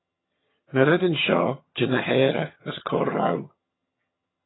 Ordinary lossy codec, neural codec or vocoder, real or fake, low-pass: AAC, 16 kbps; vocoder, 22.05 kHz, 80 mel bands, HiFi-GAN; fake; 7.2 kHz